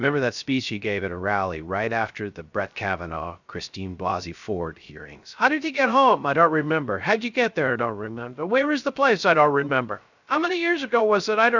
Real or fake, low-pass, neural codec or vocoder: fake; 7.2 kHz; codec, 16 kHz, 0.3 kbps, FocalCodec